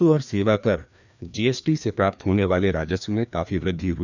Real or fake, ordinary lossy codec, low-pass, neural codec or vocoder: fake; none; 7.2 kHz; codec, 16 kHz, 2 kbps, FreqCodec, larger model